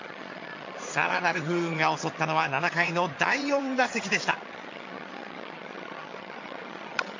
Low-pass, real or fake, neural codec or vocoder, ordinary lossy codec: 7.2 kHz; fake; vocoder, 22.05 kHz, 80 mel bands, HiFi-GAN; AAC, 48 kbps